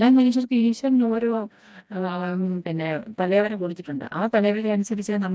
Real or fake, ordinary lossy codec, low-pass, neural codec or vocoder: fake; none; none; codec, 16 kHz, 1 kbps, FreqCodec, smaller model